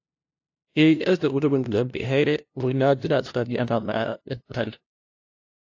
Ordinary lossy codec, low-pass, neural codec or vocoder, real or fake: AAC, 48 kbps; 7.2 kHz; codec, 16 kHz, 0.5 kbps, FunCodec, trained on LibriTTS, 25 frames a second; fake